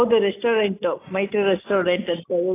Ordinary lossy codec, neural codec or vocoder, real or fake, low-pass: AAC, 24 kbps; none; real; 3.6 kHz